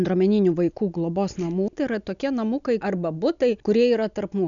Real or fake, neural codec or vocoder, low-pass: real; none; 7.2 kHz